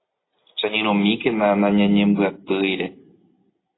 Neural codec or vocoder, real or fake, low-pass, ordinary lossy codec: none; real; 7.2 kHz; AAC, 16 kbps